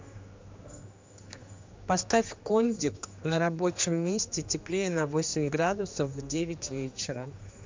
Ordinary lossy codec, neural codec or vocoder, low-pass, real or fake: MP3, 64 kbps; codec, 16 kHz, 2 kbps, X-Codec, HuBERT features, trained on general audio; 7.2 kHz; fake